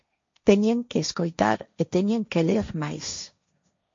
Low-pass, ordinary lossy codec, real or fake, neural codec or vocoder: 7.2 kHz; MP3, 48 kbps; fake; codec, 16 kHz, 1.1 kbps, Voila-Tokenizer